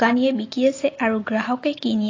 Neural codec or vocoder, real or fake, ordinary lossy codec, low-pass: none; real; AAC, 32 kbps; 7.2 kHz